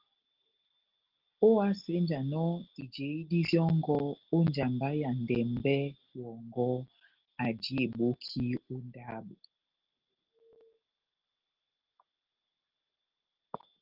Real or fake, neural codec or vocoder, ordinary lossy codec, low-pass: real; none; Opus, 16 kbps; 5.4 kHz